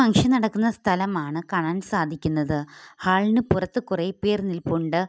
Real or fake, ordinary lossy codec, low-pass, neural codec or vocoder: real; none; none; none